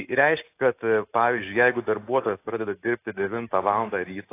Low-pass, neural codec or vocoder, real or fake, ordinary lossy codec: 3.6 kHz; none; real; AAC, 24 kbps